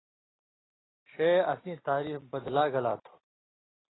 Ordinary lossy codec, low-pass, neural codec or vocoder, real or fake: AAC, 16 kbps; 7.2 kHz; none; real